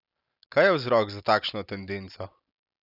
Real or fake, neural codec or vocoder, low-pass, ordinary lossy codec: real; none; 5.4 kHz; none